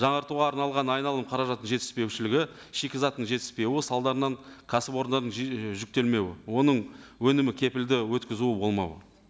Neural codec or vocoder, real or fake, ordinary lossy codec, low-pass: none; real; none; none